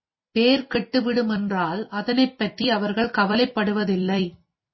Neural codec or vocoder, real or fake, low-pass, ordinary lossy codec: vocoder, 44.1 kHz, 128 mel bands every 256 samples, BigVGAN v2; fake; 7.2 kHz; MP3, 24 kbps